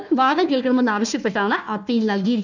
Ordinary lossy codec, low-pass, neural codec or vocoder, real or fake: none; 7.2 kHz; codec, 16 kHz, 1 kbps, FunCodec, trained on Chinese and English, 50 frames a second; fake